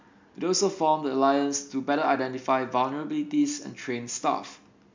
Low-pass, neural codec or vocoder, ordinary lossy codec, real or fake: 7.2 kHz; none; MP3, 64 kbps; real